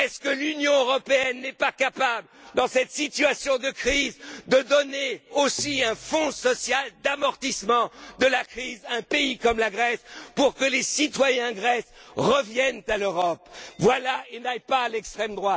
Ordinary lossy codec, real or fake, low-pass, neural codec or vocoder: none; real; none; none